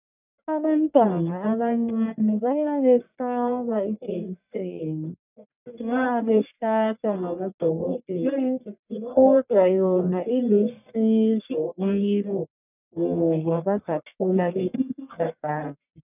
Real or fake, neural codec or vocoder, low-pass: fake; codec, 44.1 kHz, 1.7 kbps, Pupu-Codec; 3.6 kHz